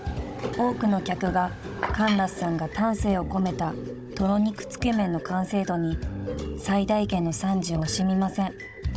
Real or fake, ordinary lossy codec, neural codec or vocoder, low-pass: fake; none; codec, 16 kHz, 16 kbps, FunCodec, trained on Chinese and English, 50 frames a second; none